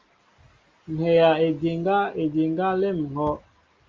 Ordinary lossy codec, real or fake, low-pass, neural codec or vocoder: Opus, 32 kbps; real; 7.2 kHz; none